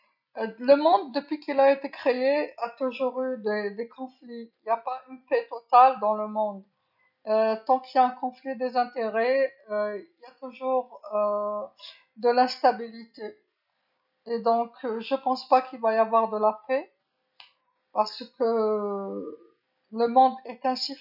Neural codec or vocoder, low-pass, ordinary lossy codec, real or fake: none; 5.4 kHz; none; real